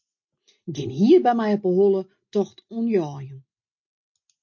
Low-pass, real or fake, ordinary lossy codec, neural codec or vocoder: 7.2 kHz; real; MP3, 32 kbps; none